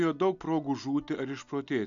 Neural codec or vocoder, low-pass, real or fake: none; 7.2 kHz; real